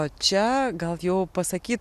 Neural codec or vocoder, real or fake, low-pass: none; real; 14.4 kHz